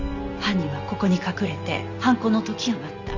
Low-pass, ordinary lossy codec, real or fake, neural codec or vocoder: 7.2 kHz; none; real; none